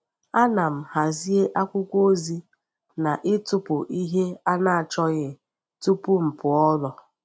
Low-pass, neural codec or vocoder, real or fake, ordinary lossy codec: none; none; real; none